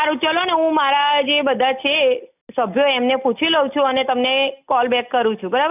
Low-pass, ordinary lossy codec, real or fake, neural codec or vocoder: 3.6 kHz; none; real; none